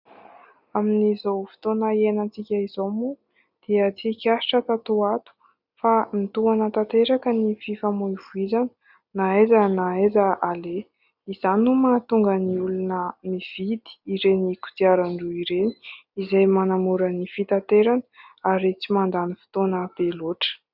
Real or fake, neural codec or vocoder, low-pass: real; none; 5.4 kHz